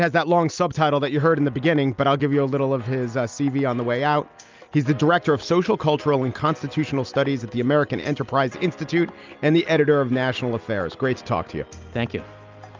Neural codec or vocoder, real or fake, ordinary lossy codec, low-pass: none; real; Opus, 24 kbps; 7.2 kHz